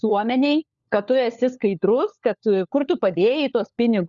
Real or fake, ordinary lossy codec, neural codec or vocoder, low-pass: fake; Opus, 64 kbps; codec, 16 kHz, 4 kbps, FunCodec, trained on LibriTTS, 50 frames a second; 7.2 kHz